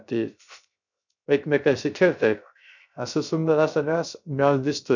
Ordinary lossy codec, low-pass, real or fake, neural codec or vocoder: none; 7.2 kHz; fake; codec, 16 kHz, 0.3 kbps, FocalCodec